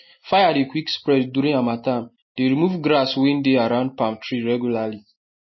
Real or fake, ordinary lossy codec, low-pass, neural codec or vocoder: real; MP3, 24 kbps; 7.2 kHz; none